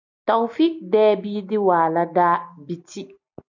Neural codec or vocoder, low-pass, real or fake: none; 7.2 kHz; real